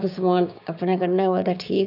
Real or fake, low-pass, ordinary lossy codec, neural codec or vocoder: fake; 5.4 kHz; none; codec, 16 kHz, 6 kbps, DAC